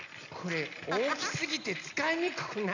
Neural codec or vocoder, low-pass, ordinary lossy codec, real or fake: none; 7.2 kHz; none; real